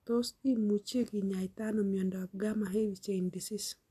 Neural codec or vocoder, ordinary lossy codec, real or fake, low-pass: none; AAC, 96 kbps; real; 14.4 kHz